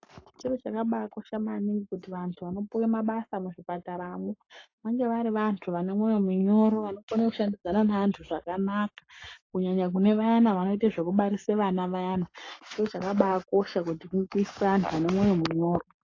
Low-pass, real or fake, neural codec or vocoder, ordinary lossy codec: 7.2 kHz; fake; codec, 44.1 kHz, 7.8 kbps, Pupu-Codec; AAC, 32 kbps